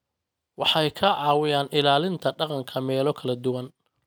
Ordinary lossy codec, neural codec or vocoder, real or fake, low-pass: none; none; real; none